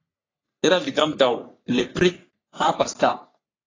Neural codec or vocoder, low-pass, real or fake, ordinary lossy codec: codec, 44.1 kHz, 3.4 kbps, Pupu-Codec; 7.2 kHz; fake; AAC, 32 kbps